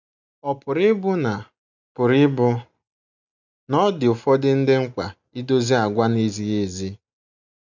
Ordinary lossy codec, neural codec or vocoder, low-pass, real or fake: none; none; 7.2 kHz; real